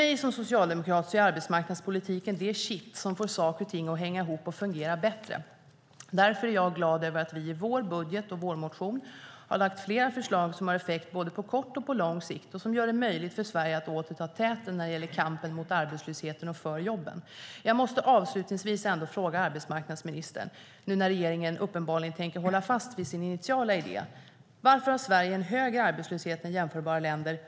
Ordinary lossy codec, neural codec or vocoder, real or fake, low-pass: none; none; real; none